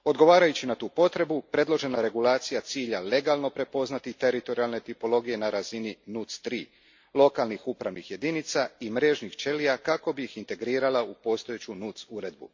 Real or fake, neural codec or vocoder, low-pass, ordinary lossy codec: real; none; 7.2 kHz; MP3, 48 kbps